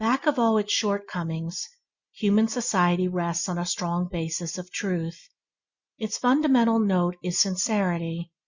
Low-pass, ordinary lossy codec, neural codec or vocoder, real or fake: 7.2 kHz; Opus, 64 kbps; none; real